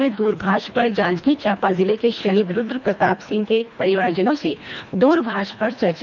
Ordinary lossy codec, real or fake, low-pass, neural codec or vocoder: none; fake; 7.2 kHz; codec, 24 kHz, 1.5 kbps, HILCodec